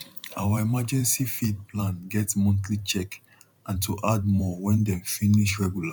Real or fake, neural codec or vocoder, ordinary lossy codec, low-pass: fake; vocoder, 44.1 kHz, 128 mel bands every 512 samples, BigVGAN v2; none; 19.8 kHz